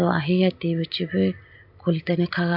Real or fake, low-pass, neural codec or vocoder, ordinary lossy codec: real; 5.4 kHz; none; AAC, 48 kbps